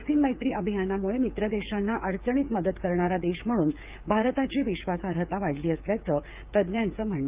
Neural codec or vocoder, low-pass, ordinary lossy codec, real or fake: codec, 16 kHz, 8 kbps, FreqCodec, smaller model; 3.6 kHz; Opus, 24 kbps; fake